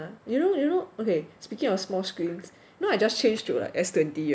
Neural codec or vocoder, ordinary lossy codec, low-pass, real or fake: none; none; none; real